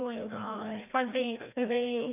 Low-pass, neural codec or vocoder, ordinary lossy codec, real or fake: 3.6 kHz; codec, 16 kHz, 1 kbps, FreqCodec, larger model; none; fake